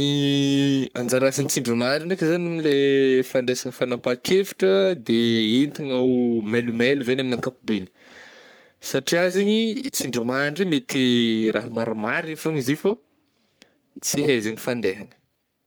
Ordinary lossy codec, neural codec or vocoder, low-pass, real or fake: none; codec, 44.1 kHz, 3.4 kbps, Pupu-Codec; none; fake